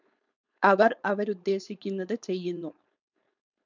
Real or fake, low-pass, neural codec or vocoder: fake; 7.2 kHz; codec, 16 kHz, 4.8 kbps, FACodec